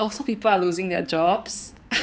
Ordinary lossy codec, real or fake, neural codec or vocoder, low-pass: none; fake; codec, 16 kHz, 4 kbps, X-Codec, HuBERT features, trained on balanced general audio; none